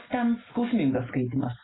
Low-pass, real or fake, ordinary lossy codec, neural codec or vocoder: 7.2 kHz; real; AAC, 16 kbps; none